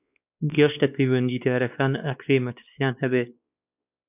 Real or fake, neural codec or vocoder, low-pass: fake; codec, 16 kHz, 2 kbps, X-Codec, WavLM features, trained on Multilingual LibriSpeech; 3.6 kHz